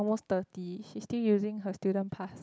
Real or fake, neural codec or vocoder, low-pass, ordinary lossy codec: real; none; none; none